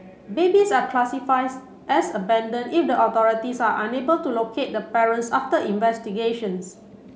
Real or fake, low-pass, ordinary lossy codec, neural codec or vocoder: real; none; none; none